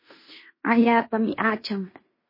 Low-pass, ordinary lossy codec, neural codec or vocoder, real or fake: 5.4 kHz; MP3, 24 kbps; codec, 16 kHz in and 24 kHz out, 0.9 kbps, LongCat-Audio-Codec, fine tuned four codebook decoder; fake